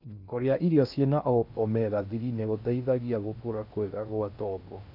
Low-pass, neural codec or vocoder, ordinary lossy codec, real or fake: 5.4 kHz; codec, 16 kHz in and 24 kHz out, 0.6 kbps, FocalCodec, streaming, 2048 codes; AAC, 48 kbps; fake